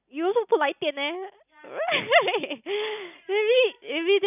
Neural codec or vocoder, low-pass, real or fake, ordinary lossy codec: none; 3.6 kHz; real; none